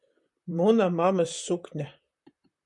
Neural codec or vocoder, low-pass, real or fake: vocoder, 44.1 kHz, 128 mel bands, Pupu-Vocoder; 10.8 kHz; fake